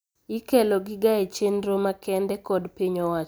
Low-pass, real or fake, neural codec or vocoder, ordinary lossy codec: none; real; none; none